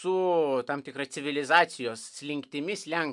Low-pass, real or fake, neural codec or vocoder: 10.8 kHz; real; none